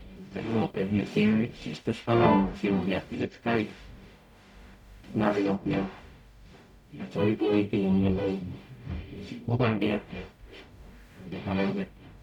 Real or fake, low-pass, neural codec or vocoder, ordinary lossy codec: fake; 19.8 kHz; codec, 44.1 kHz, 0.9 kbps, DAC; none